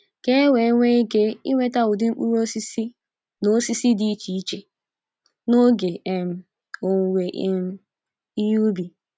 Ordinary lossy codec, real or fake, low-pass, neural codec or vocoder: none; real; none; none